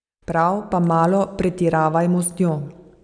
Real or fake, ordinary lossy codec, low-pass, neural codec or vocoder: real; none; 9.9 kHz; none